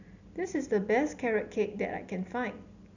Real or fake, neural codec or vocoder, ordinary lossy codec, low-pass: real; none; none; 7.2 kHz